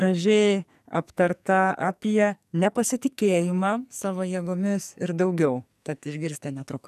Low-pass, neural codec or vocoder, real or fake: 14.4 kHz; codec, 44.1 kHz, 2.6 kbps, SNAC; fake